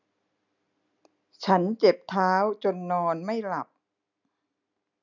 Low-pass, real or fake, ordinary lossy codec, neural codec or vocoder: 7.2 kHz; real; none; none